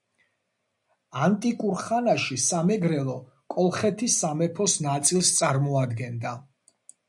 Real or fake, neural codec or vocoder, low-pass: real; none; 10.8 kHz